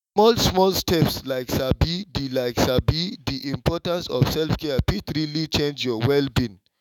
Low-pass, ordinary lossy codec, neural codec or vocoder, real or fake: 19.8 kHz; none; autoencoder, 48 kHz, 128 numbers a frame, DAC-VAE, trained on Japanese speech; fake